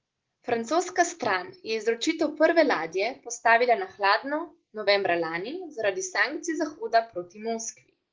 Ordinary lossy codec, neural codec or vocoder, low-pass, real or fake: Opus, 16 kbps; none; 7.2 kHz; real